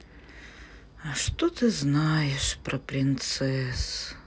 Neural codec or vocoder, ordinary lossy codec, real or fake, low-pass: none; none; real; none